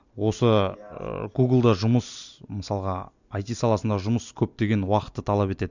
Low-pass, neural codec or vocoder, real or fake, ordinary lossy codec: 7.2 kHz; none; real; none